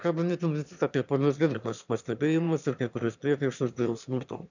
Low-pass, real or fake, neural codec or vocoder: 7.2 kHz; fake; autoencoder, 22.05 kHz, a latent of 192 numbers a frame, VITS, trained on one speaker